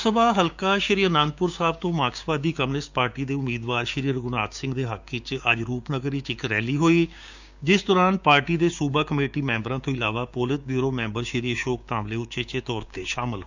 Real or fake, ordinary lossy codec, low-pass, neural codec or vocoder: fake; none; 7.2 kHz; codec, 16 kHz, 6 kbps, DAC